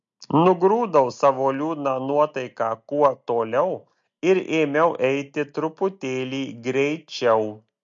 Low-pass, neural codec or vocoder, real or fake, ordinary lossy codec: 7.2 kHz; none; real; MP3, 48 kbps